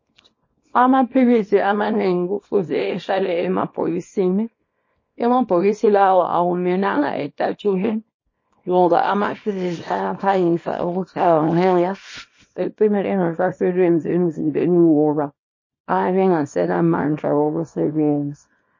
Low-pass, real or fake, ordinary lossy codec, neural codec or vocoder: 7.2 kHz; fake; MP3, 32 kbps; codec, 24 kHz, 0.9 kbps, WavTokenizer, small release